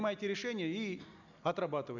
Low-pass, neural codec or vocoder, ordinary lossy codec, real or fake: 7.2 kHz; none; none; real